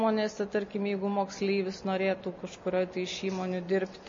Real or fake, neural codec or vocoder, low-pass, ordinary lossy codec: real; none; 7.2 kHz; MP3, 32 kbps